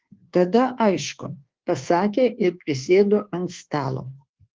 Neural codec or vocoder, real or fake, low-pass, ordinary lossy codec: codec, 24 kHz, 1.2 kbps, DualCodec; fake; 7.2 kHz; Opus, 16 kbps